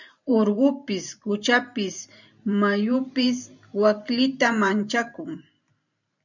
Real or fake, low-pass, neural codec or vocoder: fake; 7.2 kHz; vocoder, 44.1 kHz, 128 mel bands every 512 samples, BigVGAN v2